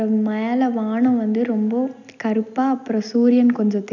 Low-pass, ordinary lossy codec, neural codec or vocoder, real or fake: 7.2 kHz; none; none; real